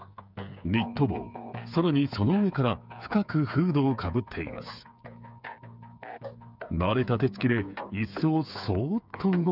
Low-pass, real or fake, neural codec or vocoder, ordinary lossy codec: 5.4 kHz; fake; codec, 16 kHz, 8 kbps, FreqCodec, smaller model; none